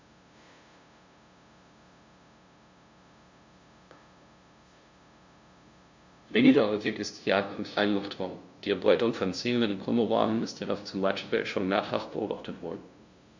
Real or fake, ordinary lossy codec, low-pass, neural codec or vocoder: fake; MP3, 64 kbps; 7.2 kHz; codec, 16 kHz, 0.5 kbps, FunCodec, trained on LibriTTS, 25 frames a second